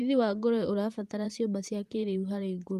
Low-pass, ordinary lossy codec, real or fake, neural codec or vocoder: 14.4 kHz; Opus, 32 kbps; fake; vocoder, 44.1 kHz, 128 mel bands, Pupu-Vocoder